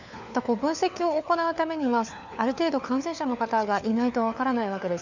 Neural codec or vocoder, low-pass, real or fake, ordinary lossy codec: codec, 16 kHz, 4 kbps, FunCodec, trained on LibriTTS, 50 frames a second; 7.2 kHz; fake; none